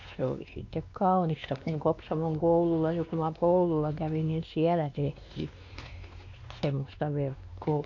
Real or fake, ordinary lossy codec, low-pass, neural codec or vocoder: fake; AAC, 48 kbps; 7.2 kHz; codec, 16 kHz, 2 kbps, X-Codec, WavLM features, trained on Multilingual LibriSpeech